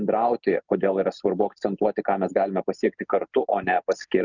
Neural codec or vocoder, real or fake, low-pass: none; real; 7.2 kHz